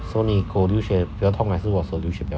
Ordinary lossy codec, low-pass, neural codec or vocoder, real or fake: none; none; none; real